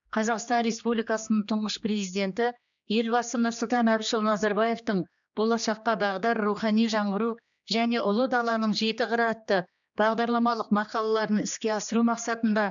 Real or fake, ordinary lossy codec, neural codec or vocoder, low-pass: fake; MP3, 96 kbps; codec, 16 kHz, 2 kbps, X-Codec, HuBERT features, trained on general audio; 7.2 kHz